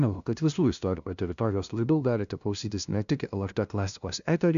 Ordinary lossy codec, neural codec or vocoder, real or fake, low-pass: AAC, 96 kbps; codec, 16 kHz, 0.5 kbps, FunCodec, trained on LibriTTS, 25 frames a second; fake; 7.2 kHz